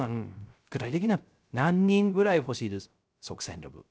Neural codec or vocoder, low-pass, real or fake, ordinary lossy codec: codec, 16 kHz, 0.3 kbps, FocalCodec; none; fake; none